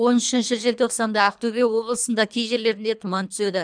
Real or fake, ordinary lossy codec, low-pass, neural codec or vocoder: fake; none; 9.9 kHz; codec, 24 kHz, 3 kbps, HILCodec